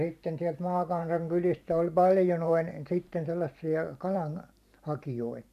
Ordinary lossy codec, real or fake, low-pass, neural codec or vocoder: none; fake; 14.4 kHz; vocoder, 44.1 kHz, 128 mel bands every 512 samples, BigVGAN v2